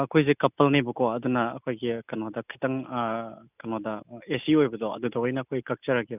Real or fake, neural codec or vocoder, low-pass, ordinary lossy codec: real; none; 3.6 kHz; none